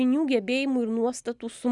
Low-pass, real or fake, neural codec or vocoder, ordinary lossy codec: 10.8 kHz; real; none; Opus, 64 kbps